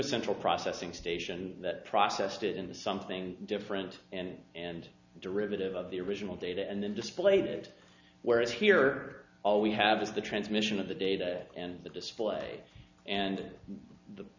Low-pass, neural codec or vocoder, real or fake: 7.2 kHz; none; real